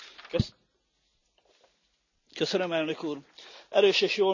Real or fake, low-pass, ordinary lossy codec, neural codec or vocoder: real; 7.2 kHz; none; none